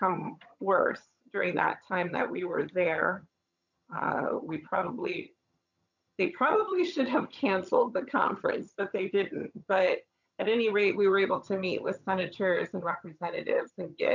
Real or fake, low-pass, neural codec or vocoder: fake; 7.2 kHz; vocoder, 22.05 kHz, 80 mel bands, HiFi-GAN